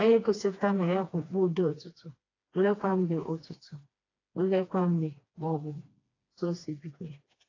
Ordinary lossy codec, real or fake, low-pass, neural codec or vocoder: AAC, 32 kbps; fake; 7.2 kHz; codec, 16 kHz, 2 kbps, FreqCodec, smaller model